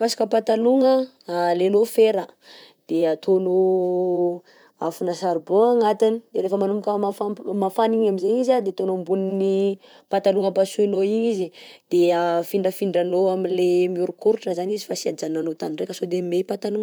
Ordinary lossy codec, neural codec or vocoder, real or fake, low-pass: none; vocoder, 44.1 kHz, 128 mel bands, Pupu-Vocoder; fake; none